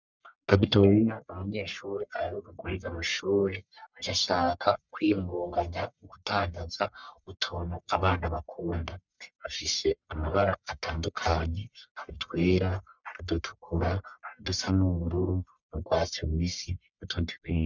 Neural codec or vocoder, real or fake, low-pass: codec, 44.1 kHz, 1.7 kbps, Pupu-Codec; fake; 7.2 kHz